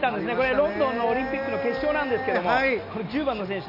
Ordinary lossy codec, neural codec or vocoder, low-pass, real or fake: none; none; 5.4 kHz; real